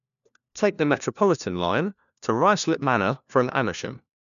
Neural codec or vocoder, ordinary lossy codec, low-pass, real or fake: codec, 16 kHz, 1 kbps, FunCodec, trained on LibriTTS, 50 frames a second; none; 7.2 kHz; fake